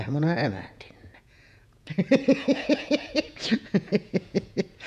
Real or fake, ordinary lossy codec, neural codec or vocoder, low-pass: fake; none; vocoder, 48 kHz, 128 mel bands, Vocos; 14.4 kHz